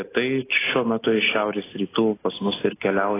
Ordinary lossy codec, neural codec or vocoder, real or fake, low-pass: AAC, 16 kbps; none; real; 3.6 kHz